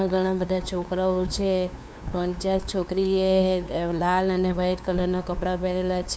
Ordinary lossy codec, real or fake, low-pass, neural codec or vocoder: none; fake; none; codec, 16 kHz, 2 kbps, FunCodec, trained on LibriTTS, 25 frames a second